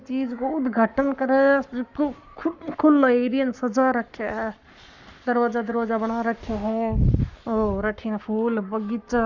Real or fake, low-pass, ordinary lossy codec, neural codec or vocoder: fake; 7.2 kHz; Opus, 64 kbps; codec, 44.1 kHz, 7.8 kbps, Pupu-Codec